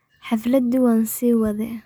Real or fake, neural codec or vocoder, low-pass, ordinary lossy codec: real; none; none; none